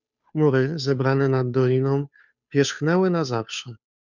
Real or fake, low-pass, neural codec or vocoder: fake; 7.2 kHz; codec, 16 kHz, 2 kbps, FunCodec, trained on Chinese and English, 25 frames a second